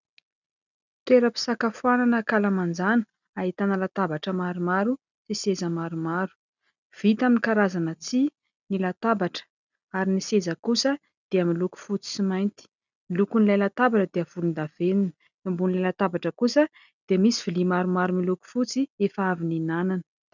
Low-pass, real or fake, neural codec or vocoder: 7.2 kHz; real; none